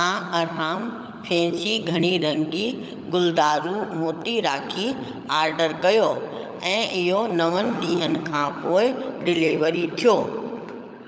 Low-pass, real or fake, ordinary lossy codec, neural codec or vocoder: none; fake; none; codec, 16 kHz, 16 kbps, FunCodec, trained on LibriTTS, 50 frames a second